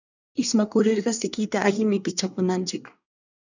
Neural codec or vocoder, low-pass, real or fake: codec, 24 kHz, 1 kbps, SNAC; 7.2 kHz; fake